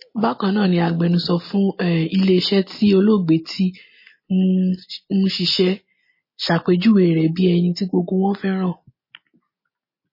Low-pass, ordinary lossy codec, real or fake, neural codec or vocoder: 5.4 kHz; MP3, 24 kbps; real; none